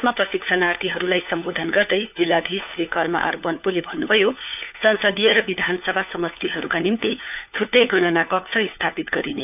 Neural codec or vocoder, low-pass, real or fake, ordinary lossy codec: codec, 16 kHz, 4 kbps, FunCodec, trained on LibriTTS, 50 frames a second; 3.6 kHz; fake; none